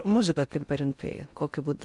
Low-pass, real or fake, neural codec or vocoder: 10.8 kHz; fake; codec, 16 kHz in and 24 kHz out, 0.6 kbps, FocalCodec, streaming, 4096 codes